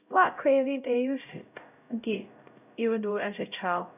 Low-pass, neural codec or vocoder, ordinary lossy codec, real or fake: 3.6 kHz; codec, 16 kHz, 0.5 kbps, X-Codec, HuBERT features, trained on LibriSpeech; none; fake